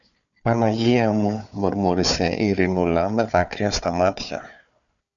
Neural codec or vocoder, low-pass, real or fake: codec, 16 kHz, 4 kbps, FunCodec, trained on Chinese and English, 50 frames a second; 7.2 kHz; fake